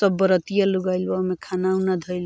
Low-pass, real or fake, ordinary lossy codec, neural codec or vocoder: none; real; none; none